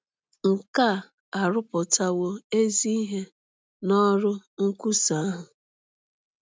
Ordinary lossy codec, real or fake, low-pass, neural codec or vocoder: none; real; none; none